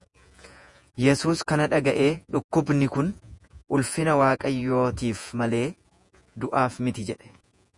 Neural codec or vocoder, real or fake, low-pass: vocoder, 48 kHz, 128 mel bands, Vocos; fake; 10.8 kHz